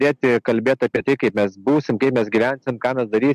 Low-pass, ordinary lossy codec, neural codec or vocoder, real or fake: 14.4 kHz; MP3, 64 kbps; none; real